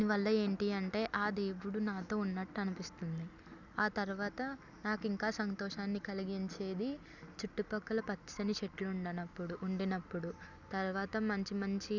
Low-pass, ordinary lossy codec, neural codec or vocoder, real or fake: 7.2 kHz; none; none; real